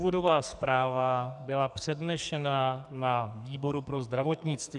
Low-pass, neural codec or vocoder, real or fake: 10.8 kHz; codec, 44.1 kHz, 2.6 kbps, SNAC; fake